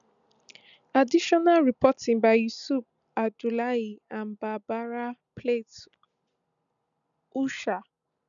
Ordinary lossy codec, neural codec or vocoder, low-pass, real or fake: none; none; 7.2 kHz; real